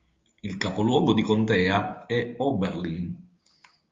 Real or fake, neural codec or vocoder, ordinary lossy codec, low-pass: fake; codec, 16 kHz, 6 kbps, DAC; Opus, 32 kbps; 7.2 kHz